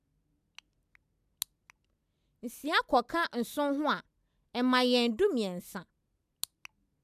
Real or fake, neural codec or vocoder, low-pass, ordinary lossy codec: real; none; 14.4 kHz; none